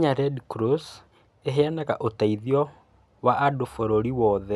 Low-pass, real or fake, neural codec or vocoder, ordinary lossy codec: none; real; none; none